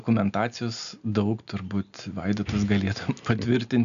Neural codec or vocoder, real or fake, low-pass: none; real; 7.2 kHz